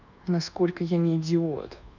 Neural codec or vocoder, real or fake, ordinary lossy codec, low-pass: codec, 24 kHz, 1.2 kbps, DualCodec; fake; none; 7.2 kHz